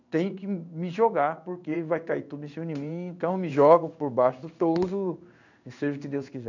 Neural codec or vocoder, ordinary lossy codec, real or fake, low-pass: codec, 16 kHz in and 24 kHz out, 1 kbps, XY-Tokenizer; none; fake; 7.2 kHz